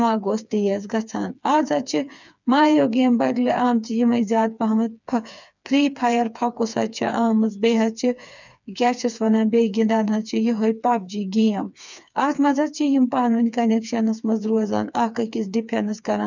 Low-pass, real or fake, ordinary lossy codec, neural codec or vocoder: 7.2 kHz; fake; none; codec, 16 kHz, 4 kbps, FreqCodec, smaller model